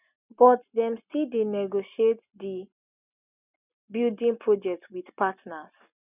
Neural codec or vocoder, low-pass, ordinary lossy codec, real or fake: none; 3.6 kHz; none; real